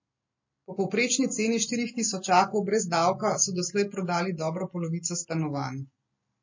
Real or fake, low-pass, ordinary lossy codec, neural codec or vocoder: real; 7.2 kHz; MP3, 32 kbps; none